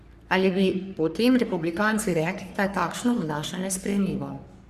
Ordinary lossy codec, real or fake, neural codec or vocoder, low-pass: Opus, 64 kbps; fake; codec, 44.1 kHz, 3.4 kbps, Pupu-Codec; 14.4 kHz